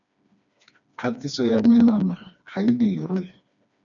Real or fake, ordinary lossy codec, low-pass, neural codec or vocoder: fake; MP3, 96 kbps; 7.2 kHz; codec, 16 kHz, 2 kbps, FreqCodec, smaller model